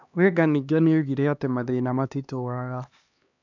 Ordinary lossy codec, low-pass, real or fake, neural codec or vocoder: none; 7.2 kHz; fake; codec, 16 kHz, 2 kbps, X-Codec, HuBERT features, trained on LibriSpeech